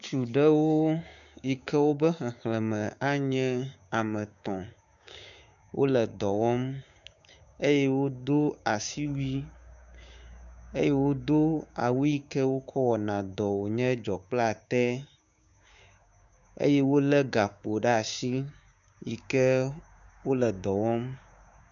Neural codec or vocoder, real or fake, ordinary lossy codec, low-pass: codec, 16 kHz, 6 kbps, DAC; fake; MP3, 96 kbps; 7.2 kHz